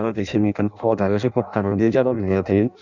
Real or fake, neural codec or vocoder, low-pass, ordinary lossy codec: fake; codec, 16 kHz in and 24 kHz out, 0.6 kbps, FireRedTTS-2 codec; 7.2 kHz; none